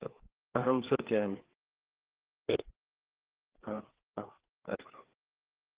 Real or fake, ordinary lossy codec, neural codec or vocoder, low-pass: fake; Opus, 16 kbps; codec, 16 kHz, 4 kbps, FreqCodec, larger model; 3.6 kHz